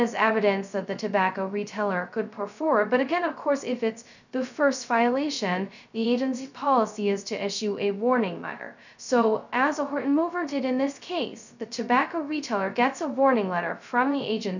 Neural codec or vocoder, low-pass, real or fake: codec, 16 kHz, 0.2 kbps, FocalCodec; 7.2 kHz; fake